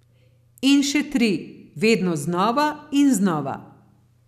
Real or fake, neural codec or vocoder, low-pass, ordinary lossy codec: real; none; 14.4 kHz; none